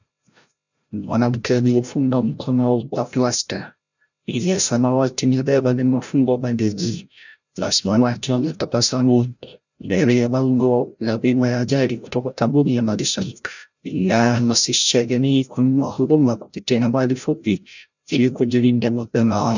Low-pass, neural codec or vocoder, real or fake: 7.2 kHz; codec, 16 kHz, 0.5 kbps, FreqCodec, larger model; fake